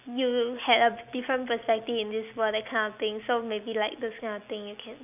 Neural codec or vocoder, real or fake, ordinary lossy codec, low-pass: none; real; none; 3.6 kHz